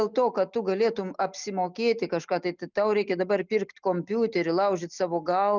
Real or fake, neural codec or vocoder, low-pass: real; none; 7.2 kHz